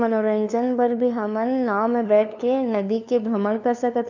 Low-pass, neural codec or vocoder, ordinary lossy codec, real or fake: 7.2 kHz; codec, 16 kHz, 2 kbps, FunCodec, trained on LibriTTS, 25 frames a second; none; fake